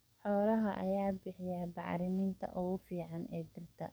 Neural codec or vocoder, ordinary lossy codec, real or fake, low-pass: codec, 44.1 kHz, 7.8 kbps, DAC; none; fake; none